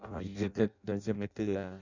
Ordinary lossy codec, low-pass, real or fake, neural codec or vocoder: none; 7.2 kHz; fake; codec, 16 kHz in and 24 kHz out, 0.6 kbps, FireRedTTS-2 codec